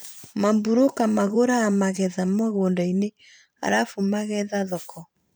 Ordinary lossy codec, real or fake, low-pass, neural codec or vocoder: none; fake; none; vocoder, 44.1 kHz, 128 mel bands every 512 samples, BigVGAN v2